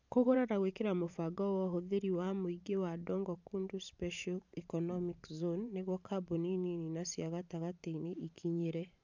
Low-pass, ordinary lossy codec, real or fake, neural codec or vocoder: 7.2 kHz; MP3, 64 kbps; fake; vocoder, 44.1 kHz, 128 mel bands every 512 samples, BigVGAN v2